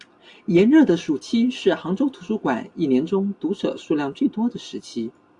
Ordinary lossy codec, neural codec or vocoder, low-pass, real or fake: AAC, 64 kbps; none; 10.8 kHz; real